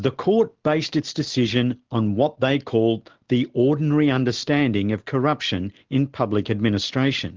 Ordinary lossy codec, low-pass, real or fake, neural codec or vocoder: Opus, 16 kbps; 7.2 kHz; real; none